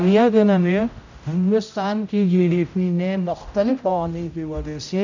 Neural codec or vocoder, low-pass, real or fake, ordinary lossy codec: codec, 16 kHz, 0.5 kbps, X-Codec, HuBERT features, trained on general audio; 7.2 kHz; fake; none